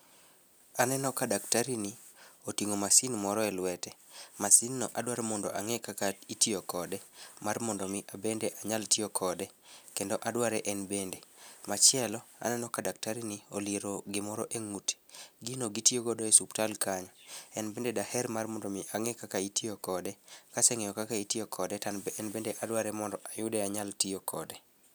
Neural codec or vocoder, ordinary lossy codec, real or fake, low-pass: none; none; real; none